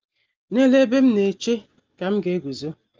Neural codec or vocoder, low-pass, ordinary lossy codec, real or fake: none; 7.2 kHz; Opus, 24 kbps; real